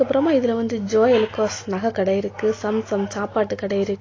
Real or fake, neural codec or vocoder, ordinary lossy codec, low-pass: real; none; AAC, 32 kbps; 7.2 kHz